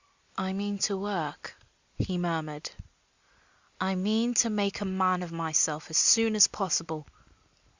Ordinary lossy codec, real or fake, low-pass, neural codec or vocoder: Opus, 64 kbps; real; 7.2 kHz; none